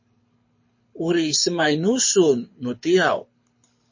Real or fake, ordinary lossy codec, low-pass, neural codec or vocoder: fake; MP3, 32 kbps; 7.2 kHz; codec, 24 kHz, 6 kbps, HILCodec